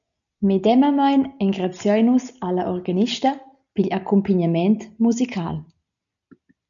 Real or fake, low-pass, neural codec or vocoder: real; 7.2 kHz; none